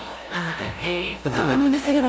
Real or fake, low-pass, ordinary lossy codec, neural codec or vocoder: fake; none; none; codec, 16 kHz, 0.5 kbps, FunCodec, trained on LibriTTS, 25 frames a second